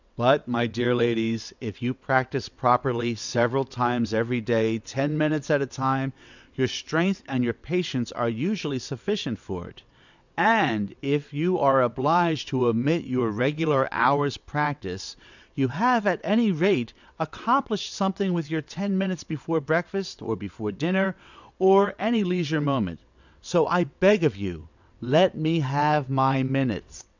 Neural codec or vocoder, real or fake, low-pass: vocoder, 22.05 kHz, 80 mel bands, WaveNeXt; fake; 7.2 kHz